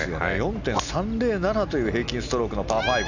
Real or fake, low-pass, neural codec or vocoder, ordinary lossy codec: real; 7.2 kHz; none; none